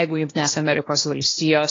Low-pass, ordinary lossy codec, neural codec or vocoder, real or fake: 7.2 kHz; AAC, 32 kbps; codec, 16 kHz, 0.8 kbps, ZipCodec; fake